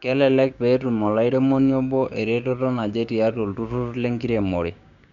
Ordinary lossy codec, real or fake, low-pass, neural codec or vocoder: none; fake; 7.2 kHz; codec, 16 kHz, 6 kbps, DAC